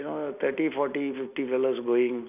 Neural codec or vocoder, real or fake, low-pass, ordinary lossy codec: none; real; 3.6 kHz; none